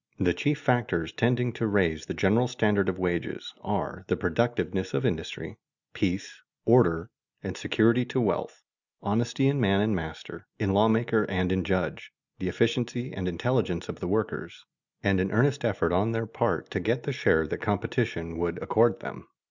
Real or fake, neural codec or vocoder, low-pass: real; none; 7.2 kHz